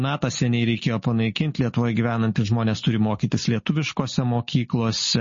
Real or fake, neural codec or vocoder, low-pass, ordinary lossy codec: real; none; 7.2 kHz; MP3, 32 kbps